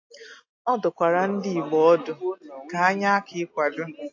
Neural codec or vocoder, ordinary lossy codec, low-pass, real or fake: none; AAC, 48 kbps; 7.2 kHz; real